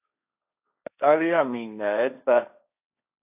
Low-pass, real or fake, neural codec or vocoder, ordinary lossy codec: 3.6 kHz; fake; codec, 16 kHz, 1.1 kbps, Voila-Tokenizer; AAC, 32 kbps